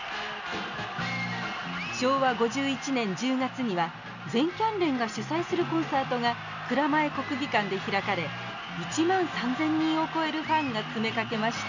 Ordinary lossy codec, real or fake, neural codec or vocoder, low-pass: none; real; none; 7.2 kHz